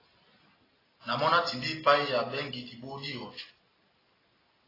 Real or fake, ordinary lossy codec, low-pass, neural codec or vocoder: real; AAC, 24 kbps; 5.4 kHz; none